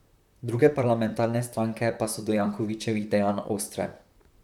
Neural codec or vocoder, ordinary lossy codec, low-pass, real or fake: vocoder, 44.1 kHz, 128 mel bands, Pupu-Vocoder; none; 19.8 kHz; fake